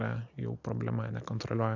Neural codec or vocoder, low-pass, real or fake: none; 7.2 kHz; real